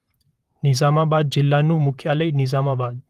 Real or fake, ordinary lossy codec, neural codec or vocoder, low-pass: fake; Opus, 24 kbps; vocoder, 44.1 kHz, 128 mel bands, Pupu-Vocoder; 14.4 kHz